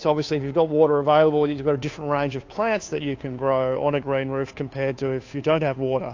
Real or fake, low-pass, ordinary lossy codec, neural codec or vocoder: fake; 7.2 kHz; AAC, 48 kbps; codec, 16 kHz, 2 kbps, FunCodec, trained on Chinese and English, 25 frames a second